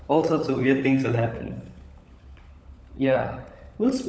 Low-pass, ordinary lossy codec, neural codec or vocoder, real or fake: none; none; codec, 16 kHz, 16 kbps, FunCodec, trained on LibriTTS, 50 frames a second; fake